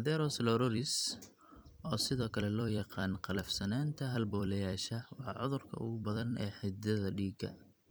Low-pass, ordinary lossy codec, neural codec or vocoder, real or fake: none; none; vocoder, 44.1 kHz, 128 mel bands every 256 samples, BigVGAN v2; fake